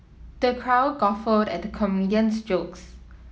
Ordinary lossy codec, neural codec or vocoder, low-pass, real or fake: none; none; none; real